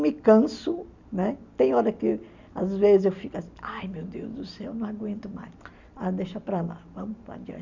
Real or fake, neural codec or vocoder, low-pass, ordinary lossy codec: real; none; 7.2 kHz; none